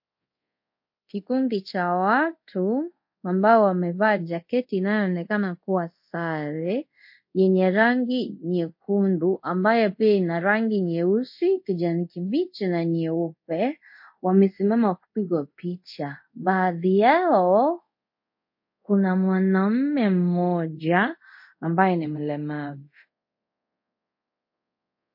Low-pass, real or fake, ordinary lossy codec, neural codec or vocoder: 5.4 kHz; fake; MP3, 32 kbps; codec, 24 kHz, 0.5 kbps, DualCodec